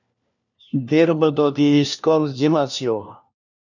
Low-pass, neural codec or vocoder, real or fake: 7.2 kHz; codec, 16 kHz, 1 kbps, FunCodec, trained on LibriTTS, 50 frames a second; fake